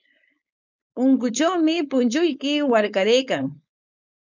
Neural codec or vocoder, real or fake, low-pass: codec, 16 kHz, 4.8 kbps, FACodec; fake; 7.2 kHz